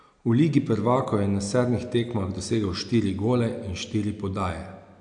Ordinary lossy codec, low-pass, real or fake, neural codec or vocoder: AAC, 64 kbps; 9.9 kHz; real; none